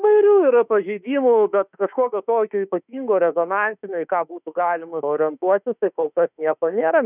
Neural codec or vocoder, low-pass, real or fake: autoencoder, 48 kHz, 32 numbers a frame, DAC-VAE, trained on Japanese speech; 3.6 kHz; fake